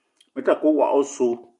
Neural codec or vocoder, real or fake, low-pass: none; real; 10.8 kHz